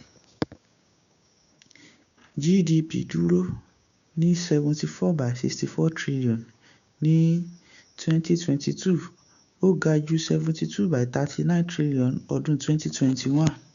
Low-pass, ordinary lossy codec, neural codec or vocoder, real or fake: 7.2 kHz; none; codec, 16 kHz, 6 kbps, DAC; fake